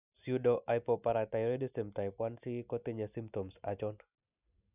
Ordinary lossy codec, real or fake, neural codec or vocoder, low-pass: none; real; none; 3.6 kHz